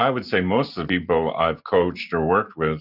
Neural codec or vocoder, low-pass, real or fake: none; 5.4 kHz; real